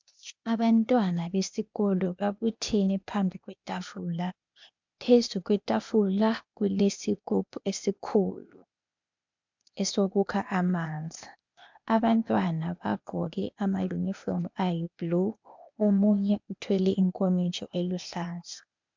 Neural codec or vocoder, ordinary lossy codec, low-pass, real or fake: codec, 16 kHz, 0.8 kbps, ZipCodec; MP3, 64 kbps; 7.2 kHz; fake